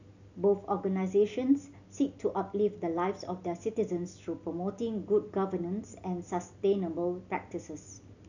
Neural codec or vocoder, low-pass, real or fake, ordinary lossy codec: none; 7.2 kHz; real; none